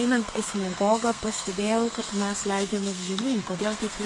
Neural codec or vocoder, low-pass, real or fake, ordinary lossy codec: codec, 32 kHz, 1.9 kbps, SNAC; 10.8 kHz; fake; MP3, 48 kbps